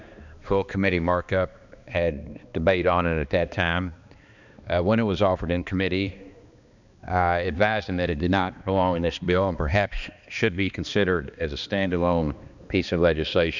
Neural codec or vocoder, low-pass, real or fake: codec, 16 kHz, 2 kbps, X-Codec, HuBERT features, trained on balanced general audio; 7.2 kHz; fake